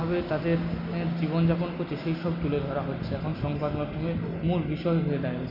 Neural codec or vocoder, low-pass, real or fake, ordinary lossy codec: none; 5.4 kHz; real; none